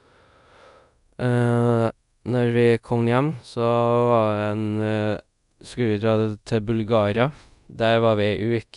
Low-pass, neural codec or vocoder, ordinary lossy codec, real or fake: 10.8 kHz; codec, 24 kHz, 0.5 kbps, DualCodec; none; fake